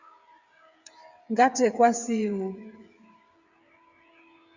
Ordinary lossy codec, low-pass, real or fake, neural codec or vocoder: Opus, 64 kbps; 7.2 kHz; fake; codec, 16 kHz, 16 kbps, FreqCodec, smaller model